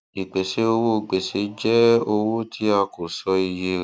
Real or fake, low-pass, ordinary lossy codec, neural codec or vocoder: real; none; none; none